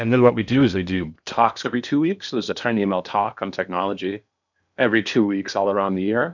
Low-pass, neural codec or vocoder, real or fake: 7.2 kHz; codec, 16 kHz in and 24 kHz out, 0.8 kbps, FocalCodec, streaming, 65536 codes; fake